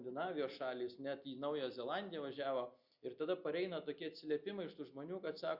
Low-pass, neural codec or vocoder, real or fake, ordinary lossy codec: 5.4 kHz; none; real; Opus, 64 kbps